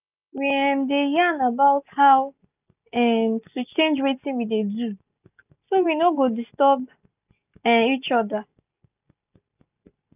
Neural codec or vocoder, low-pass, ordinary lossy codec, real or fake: none; 3.6 kHz; none; real